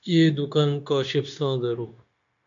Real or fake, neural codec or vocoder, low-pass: fake; codec, 16 kHz, 0.9 kbps, LongCat-Audio-Codec; 7.2 kHz